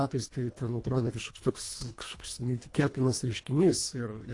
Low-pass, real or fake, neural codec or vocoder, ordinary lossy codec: 10.8 kHz; fake; codec, 24 kHz, 1.5 kbps, HILCodec; AAC, 48 kbps